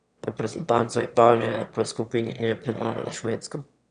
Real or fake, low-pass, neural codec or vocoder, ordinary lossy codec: fake; 9.9 kHz; autoencoder, 22.05 kHz, a latent of 192 numbers a frame, VITS, trained on one speaker; none